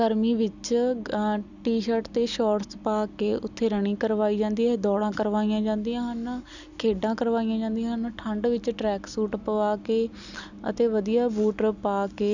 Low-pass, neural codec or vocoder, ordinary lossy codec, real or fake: 7.2 kHz; none; none; real